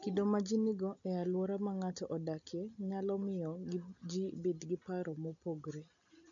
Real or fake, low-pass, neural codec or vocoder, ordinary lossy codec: real; 7.2 kHz; none; none